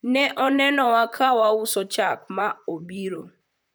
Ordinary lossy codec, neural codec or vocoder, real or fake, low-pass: none; vocoder, 44.1 kHz, 128 mel bands, Pupu-Vocoder; fake; none